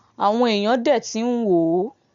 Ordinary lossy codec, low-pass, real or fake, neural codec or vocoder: MP3, 64 kbps; 7.2 kHz; real; none